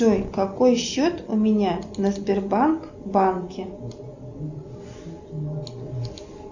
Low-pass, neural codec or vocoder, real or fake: 7.2 kHz; none; real